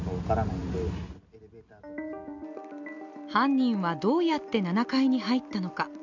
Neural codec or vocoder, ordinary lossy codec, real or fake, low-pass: none; none; real; 7.2 kHz